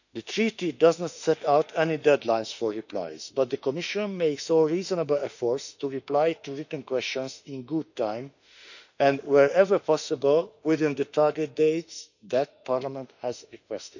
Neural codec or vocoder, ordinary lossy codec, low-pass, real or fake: autoencoder, 48 kHz, 32 numbers a frame, DAC-VAE, trained on Japanese speech; none; 7.2 kHz; fake